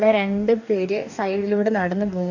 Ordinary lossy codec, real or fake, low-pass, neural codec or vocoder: none; fake; 7.2 kHz; codec, 44.1 kHz, 2.6 kbps, DAC